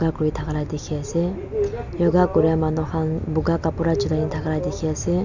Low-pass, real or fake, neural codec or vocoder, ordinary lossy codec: 7.2 kHz; real; none; none